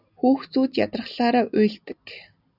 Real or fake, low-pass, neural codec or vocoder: real; 5.4 kHz; none